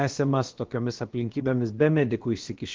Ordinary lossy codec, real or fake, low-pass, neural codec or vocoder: Opus, 16 kbps; fake; 7.2 kHz; codec, 16 kHz, about 1 kbps, DyCAST, with the encoder's durations